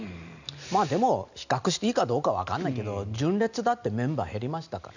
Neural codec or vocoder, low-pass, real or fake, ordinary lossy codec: none; 7.2 kHz; real; none